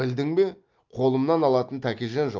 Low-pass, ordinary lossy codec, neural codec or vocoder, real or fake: 7.2 kHz; Opus, 24 kbps; none; real